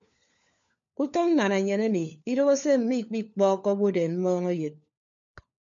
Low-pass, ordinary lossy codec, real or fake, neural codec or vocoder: 7.2 kHz; MP3, 64 kbps; fake; codec, 16 kHz, 4 kbps, FunCodec, trained on LibriTTS, 50 frames a second